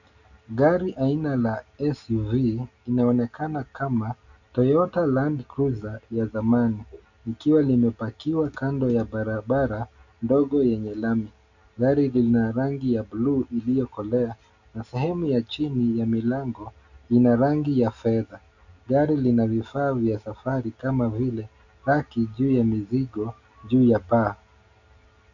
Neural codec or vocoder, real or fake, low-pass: none; real; 7.2 kHz